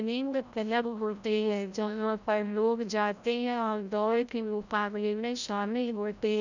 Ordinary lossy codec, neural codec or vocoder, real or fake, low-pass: none; codec, 16 kHz, 0.5 kbps, FreqCodec, larger model; fake; 7.2 kHz